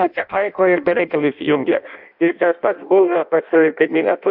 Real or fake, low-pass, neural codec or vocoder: fake; 5.4 kHz; codec, 16 kHz in and 24 kHz out, 0.6 kbps, FireRedTTS-2 codec